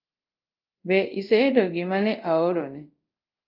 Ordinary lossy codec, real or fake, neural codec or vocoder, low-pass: Opus, 24 kbps; fake; codec, 24 kHz, 0.5 kbps, DualCodec; 5.4 kHz